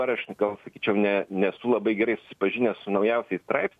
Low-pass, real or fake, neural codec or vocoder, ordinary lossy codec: 14.4 kHz; real; none; MP3, 64 kbps